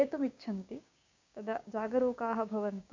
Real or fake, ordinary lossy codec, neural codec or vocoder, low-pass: fake; MP3, 64 kbps; codec, 16 kHz, 6 kbps, DAC; 7.2 kHz